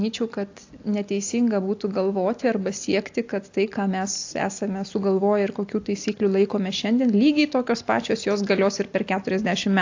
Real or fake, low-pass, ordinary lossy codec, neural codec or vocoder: real; 7.2 kHz; AAC, 48 kbps; none